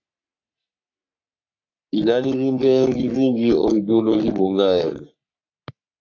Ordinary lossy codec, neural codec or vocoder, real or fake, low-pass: AAC, 48 kbps; codec, 44.1 kHz, 3.4 kbps, Pupu-Codec; fake; 7.2 kHz